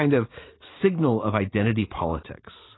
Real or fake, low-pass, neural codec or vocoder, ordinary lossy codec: fake; 7.2 kHz; vocoder, 44.1 kHz, 80 mel bands, Vocos; AAC, 16 kbps